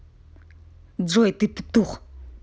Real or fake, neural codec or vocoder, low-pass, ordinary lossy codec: real; none; none; none